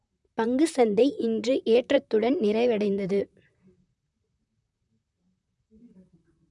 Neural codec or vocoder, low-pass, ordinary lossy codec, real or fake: vocoder, 44.1 kHz, 128 mel bands, Pupu-Vocoder; 10.8 kHz; none; fake